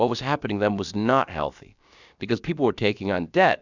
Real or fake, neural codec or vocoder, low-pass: fake; codec, 16 kHz, about 1 kbps, DyCAST, with the encoder's durations; 7.2 kHz